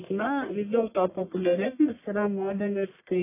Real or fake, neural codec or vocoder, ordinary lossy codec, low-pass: fake; codec, 44.1 kHz, 1.7 kbps, Pupu-Codec; AAC, 24 kbps; 3.6 kHz